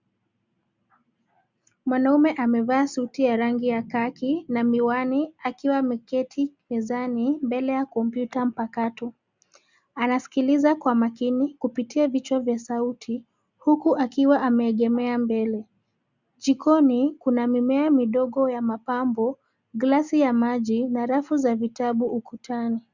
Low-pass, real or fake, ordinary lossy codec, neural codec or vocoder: 7.2 kHz; real; Opus, 64 kbps; none